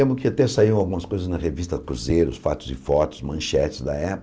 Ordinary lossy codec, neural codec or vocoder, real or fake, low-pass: none; none; real; none